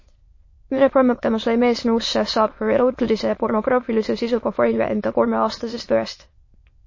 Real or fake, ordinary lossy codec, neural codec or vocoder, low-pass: fake; MP3, 32 kbps; autoencoder, 22.05 kHz, a latent of 192 numbers a frame, VITS, trained on many speakers; 7.2 kHz